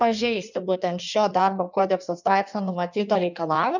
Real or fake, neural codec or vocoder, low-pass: fake; codec, 16 kHz in and 24 kHz out, 1.1 kbps, FireRedTTS-2 codec; 7.2 kHz